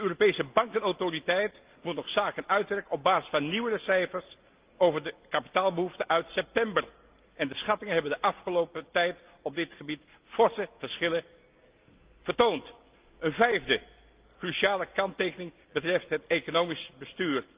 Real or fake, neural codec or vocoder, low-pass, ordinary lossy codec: real; none; 3.6 kHz; Opus, 32 kbps